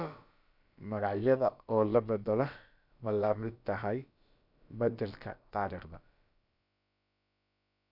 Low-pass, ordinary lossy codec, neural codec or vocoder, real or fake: 5.4 kHz; none; codec, 16 kHz, about 1 kbps, DyCAST, with the encoder's durations; fake